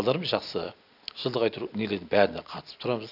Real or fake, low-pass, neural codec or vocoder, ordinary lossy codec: real; 5.4 kHz; none; none